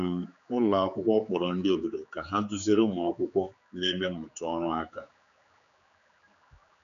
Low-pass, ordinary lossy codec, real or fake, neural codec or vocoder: 7.2 kHz; MP3, 96 kbps; fake; codec, 16 kHz, 4 kbps, X-Codec, HuBERT features, trained on general audio